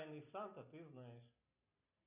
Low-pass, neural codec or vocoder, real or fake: 3.6 kHz; none; real